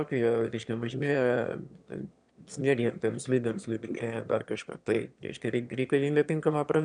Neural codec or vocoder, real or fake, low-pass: autoencoder, 22.05 kHz, a latent of 192 numbers a frame, VITS, trained on one speaker; fake; 9.9 kHz